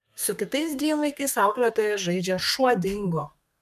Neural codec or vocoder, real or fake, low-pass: codec, 32 kHz, 1.9 kbps, SNAC; fake; 14.4 kHz